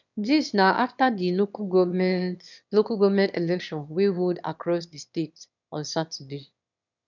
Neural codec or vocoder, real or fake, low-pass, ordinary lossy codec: autoencoder, 22.05 kHz, a latent of 192 numbers a frame, VITS, trained on one speaker; fake; 7.2 kHz; none